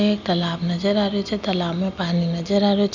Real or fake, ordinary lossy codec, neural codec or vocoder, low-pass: real; none; none; 7.2 kHz